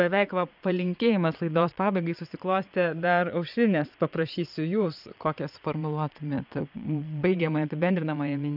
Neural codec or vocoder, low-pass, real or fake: vocoder, 22.05 kHz, 80 mel bands, Vocos; 5.4 kHz; fake